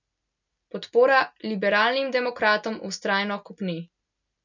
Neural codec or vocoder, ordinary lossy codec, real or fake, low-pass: none; none; real; 7.2 kHz